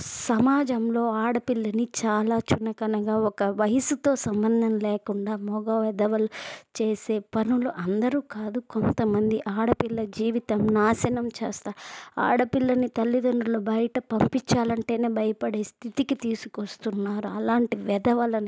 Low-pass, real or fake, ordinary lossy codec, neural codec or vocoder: none; real; none; none